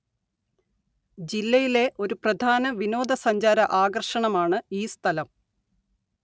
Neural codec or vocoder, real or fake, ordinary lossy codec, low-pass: none; real; none; none